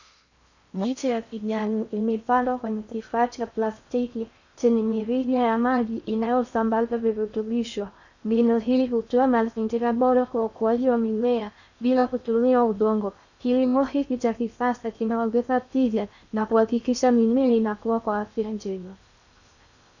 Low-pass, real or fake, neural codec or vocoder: 7.2 kHz; fake; codec, 16 kHz in and 24 kHz out, 0.6 kbps, FocalCodec, streaming, 4096 codes